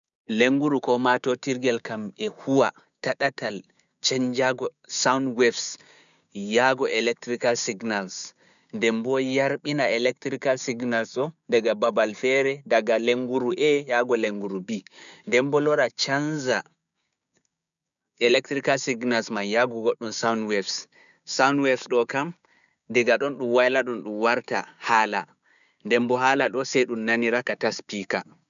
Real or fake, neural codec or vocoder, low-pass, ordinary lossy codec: fake; codec, 16 kHz, 6 kbps, DAC; 7.2 kHz; none